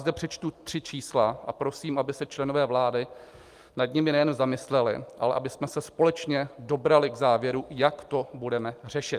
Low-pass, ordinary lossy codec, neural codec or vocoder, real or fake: 14.4 kHz; Opus, 32 kbps; none; real